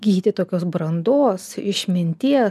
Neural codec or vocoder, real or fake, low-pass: none; real; 14.4 kHz